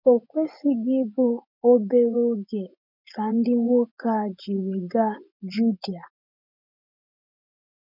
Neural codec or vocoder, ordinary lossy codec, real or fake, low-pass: vocoder, 24 kHz, 100 mel bands, Vocos; none; fake; 5.4 kHz